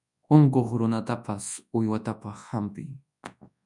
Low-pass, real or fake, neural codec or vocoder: 10.8 kHz; fake; codec, 24 kHz, 0.9 kbps, WavTokenizer, large speech release